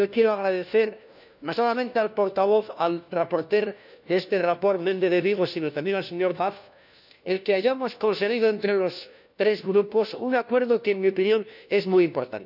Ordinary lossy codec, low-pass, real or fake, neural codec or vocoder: none; 5.4 kHz; fake; codec, 16 kHz, 1 kbps, FunCodec, trained on LibriTTS, 50 frames a second